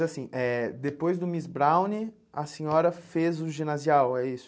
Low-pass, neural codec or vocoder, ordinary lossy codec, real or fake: none; none; none; real